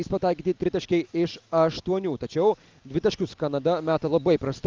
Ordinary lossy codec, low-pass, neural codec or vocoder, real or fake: Opus, 32 kbps; 7.2 kHz; none; real